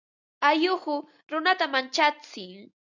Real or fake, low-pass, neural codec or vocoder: real; 7.2 kHz; none